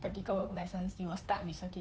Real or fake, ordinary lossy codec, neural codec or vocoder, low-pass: fake; none; codec, 16 kHz, 2 kbps, FunCodec, trained on Chinese and English, 25 frames a second; none